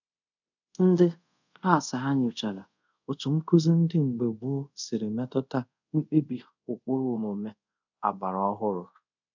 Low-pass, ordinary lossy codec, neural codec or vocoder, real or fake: 7.2 kHz; none; codec, 24 kHz, 0.5 kbps, DualCodec; fake